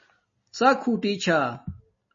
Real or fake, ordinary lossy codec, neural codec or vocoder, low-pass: real; MP3, 32 kbps; none; 7.2 kHz